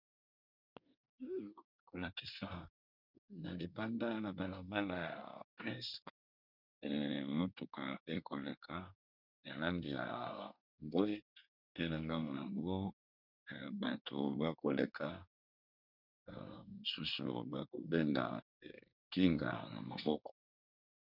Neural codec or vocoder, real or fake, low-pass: codec, 24 kHz, 1 kbps, SNAC; fake; 5.4 kHz